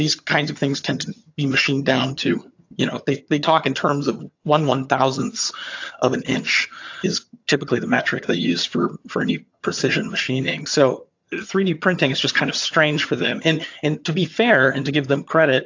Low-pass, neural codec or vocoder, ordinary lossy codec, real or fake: 7.2 kHz; vocoder, 22.05 kHz, 80 mel bands, HiFi-GAN; AAC, 48 kbps; fake